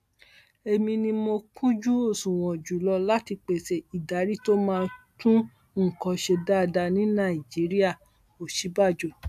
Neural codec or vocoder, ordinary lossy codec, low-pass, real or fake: none; AAC, 96 kbps; 14.4 kHz; real